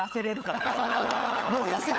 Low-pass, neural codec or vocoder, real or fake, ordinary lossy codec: none; codec, 16 kHz, 16 kbps, FunCodec, trained on LibriTTS, 50 frames a second; fake; none